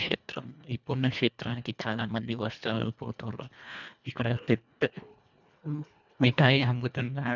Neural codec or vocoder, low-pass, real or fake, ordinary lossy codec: codec, 24 kHz, 1.5 kbps, HILCodec; 7.2 kHz; fake; none